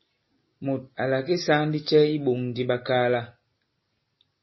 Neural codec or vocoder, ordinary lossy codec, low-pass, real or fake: none; MP3, 24 kbps; 7.2 kHz; real